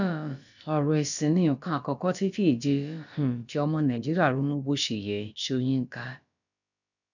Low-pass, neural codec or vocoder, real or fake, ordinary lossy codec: 7.2 kHz; codec, 16 kHz, about 1 kbps, DyCAST, with the encoder's durations; fake; none